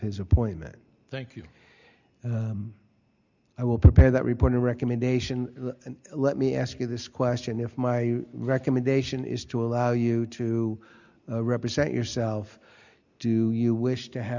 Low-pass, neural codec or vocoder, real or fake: 7.2 kHz; none; real